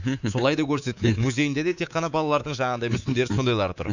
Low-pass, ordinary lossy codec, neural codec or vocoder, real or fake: 7.2 kHz; none; codec, 24 kHz, 3.1 kbps, DualCodec; fake